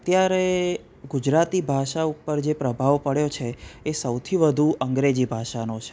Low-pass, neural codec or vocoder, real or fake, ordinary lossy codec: none; none; real; none